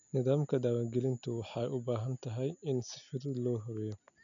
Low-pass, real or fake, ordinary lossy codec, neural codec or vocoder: 7.2 kHz; real; none; none